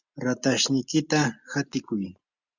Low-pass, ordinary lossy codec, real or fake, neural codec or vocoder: 7.2 kHz; Opus, 64 kbps; real; none